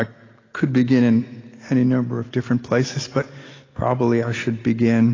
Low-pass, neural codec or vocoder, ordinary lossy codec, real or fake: 7.2 kHz; none; AAC, 32 kbps; real